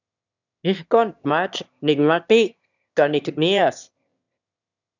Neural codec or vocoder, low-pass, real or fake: autoencoder, 22.05 kHz, a latent of 192 numbers a frame, VITS, trained on one speaker; 7.2 kHz; fake